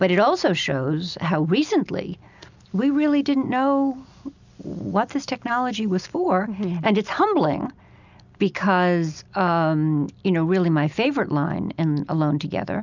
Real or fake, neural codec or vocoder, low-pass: real; none; 7.2 kHz